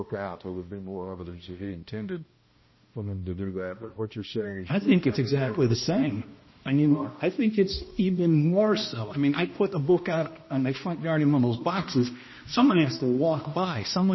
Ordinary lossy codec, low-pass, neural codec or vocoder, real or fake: MP3, 24 kbps; 7.2 kHz; codec, 16 kHz, 1 kbps, X-Codec, HuBERT features, trained on balanced general audio; fake